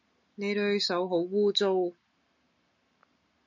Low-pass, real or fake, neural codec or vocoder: 7.2 kHz; real; none